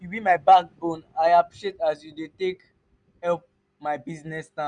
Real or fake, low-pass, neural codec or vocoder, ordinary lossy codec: real; 9.9 kHz; none; none